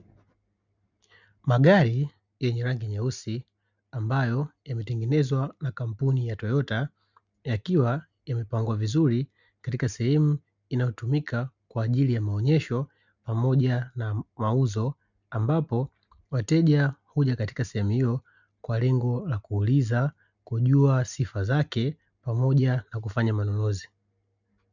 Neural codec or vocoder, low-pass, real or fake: none; 7.2 kHz; real